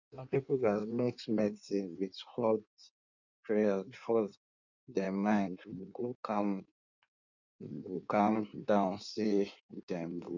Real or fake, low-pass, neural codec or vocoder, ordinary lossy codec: fake; 7.2 kHz; codec, 16 kHz in and 24 kHz out, 1.1 kbps, FireRedTTS-2 codec; none